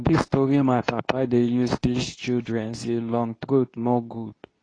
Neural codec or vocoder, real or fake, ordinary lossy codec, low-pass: codec, 24 kHz, 0.9 kbps, WavTokenizer, medium speech release version 2; fake; AAC, 32 kbps; 9.9 kHz